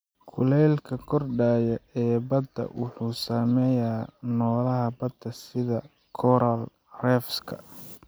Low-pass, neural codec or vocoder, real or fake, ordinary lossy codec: none; none; real; none